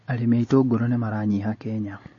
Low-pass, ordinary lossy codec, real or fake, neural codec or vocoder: 7.2 kHz; MP3, 32 kbps; real; none